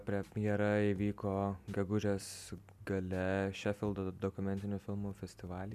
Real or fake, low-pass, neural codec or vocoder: real; 14.4 kHz; none